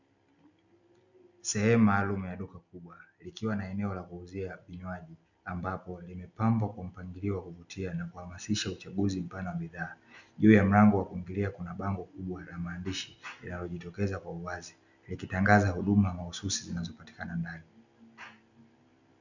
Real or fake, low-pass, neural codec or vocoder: real; 7.2 kHz; none